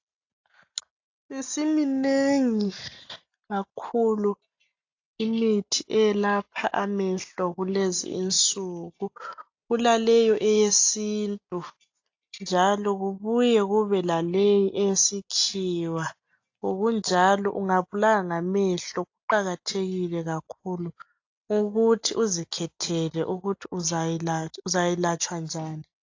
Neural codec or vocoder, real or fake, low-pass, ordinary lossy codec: none; real; 7.2 kHz; AAC, 48 kbps